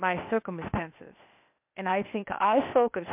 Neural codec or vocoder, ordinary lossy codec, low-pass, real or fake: codec, 16 kHz, 0.8 kbps, ZipCodec; MP3, 32 kbps; 3.6 kHz; fake